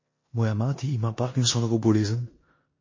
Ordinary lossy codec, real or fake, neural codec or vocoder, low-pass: MP3, 32 kbps; fake; codec, 16 kHz in and 24 kHz out, 0.9 kbps, LongCat-Audio-Codec, four codebook decoder; 7.2 kHz